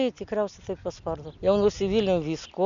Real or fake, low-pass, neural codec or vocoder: real; 7.2 kHz; none